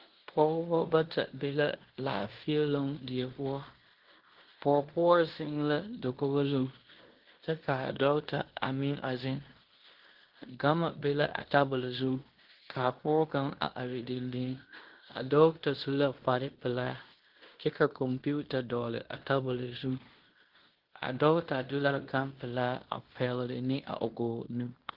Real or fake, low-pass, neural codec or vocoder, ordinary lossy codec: fake; 5.4 kHz; codec, 16 kHz in and 24 kHz out, 0.9 kbps, LongCat-Audio-Codec, fine tuned four codebook decoder; Opus, 16 kbps